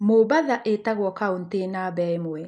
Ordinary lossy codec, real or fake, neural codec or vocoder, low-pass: none; real; none; none